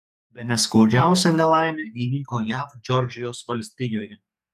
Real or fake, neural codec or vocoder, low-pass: fake; codec, 44.1 kHz, 2.6 kbps, SNAC; 14.4 kHz